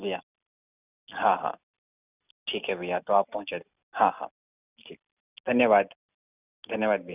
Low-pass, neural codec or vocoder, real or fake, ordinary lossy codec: 3.6 kHz; none; real; none